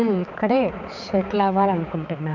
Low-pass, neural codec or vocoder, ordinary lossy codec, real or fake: 7.2 kHz; codec, 16 kHz, 4 kbps, X-Codec, HuBERT features, trained on balanced general audio; none; fake